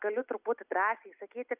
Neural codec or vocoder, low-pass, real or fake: none; 3.6 kHz; real